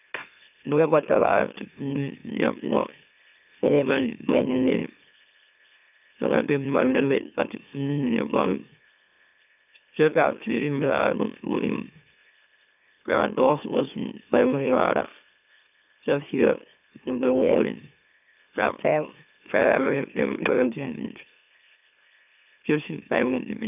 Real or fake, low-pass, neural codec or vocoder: fake; 3.6 kHz; autoencoder, 44.1 kHz, a latent of 192 numbers a frame, MeloTTS